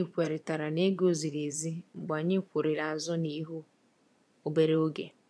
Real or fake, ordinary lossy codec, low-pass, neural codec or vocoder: fake; none; none; vocoder, 22.05 kHz, 80 mel bands, Vocos